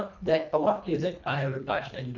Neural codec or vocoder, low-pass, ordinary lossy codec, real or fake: codec, 24 kHz, 1.5 kbps, HILCodec; 7.2 kHz; none; fake